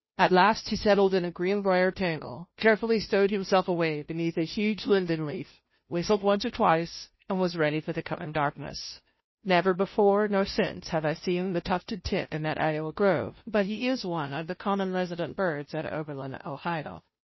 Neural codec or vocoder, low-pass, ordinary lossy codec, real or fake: codec, 16 kHz, 0.5 kbps, FunCodec, trained on Chinese and English, 25 frames a second; 7.2 kHz; MP3, 24 kbps; fake